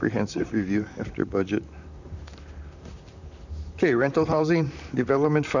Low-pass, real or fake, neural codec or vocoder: 7.2 kHz; fake; vocoder, 44.1 kHz, 128 mel bands, Pupu-Vocoder